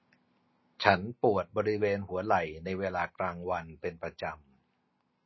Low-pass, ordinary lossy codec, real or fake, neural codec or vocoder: 7.2 kHz; MP3, 24 kbps; real; none